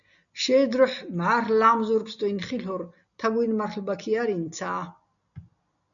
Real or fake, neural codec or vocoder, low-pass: real; none; 7.2 kHz